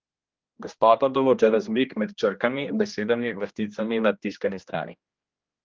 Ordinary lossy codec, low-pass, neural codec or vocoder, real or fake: Opus, 32 kbps; 7.2 kHz; codec, 16 kHz, 1 kbps, X-Codec, HuBERT features, trained on general audio; fake